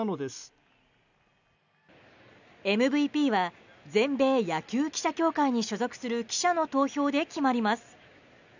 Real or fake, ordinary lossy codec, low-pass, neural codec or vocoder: real; none; 7.2 kHz; none